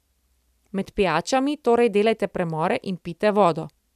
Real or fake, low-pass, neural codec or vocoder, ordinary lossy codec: real; 14.4 kHz; none; none